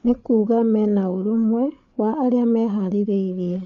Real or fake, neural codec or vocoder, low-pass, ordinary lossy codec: fake; codec, 16 kHz, 8 kbps, FreqCodec, larger model; 7.2 kHz; none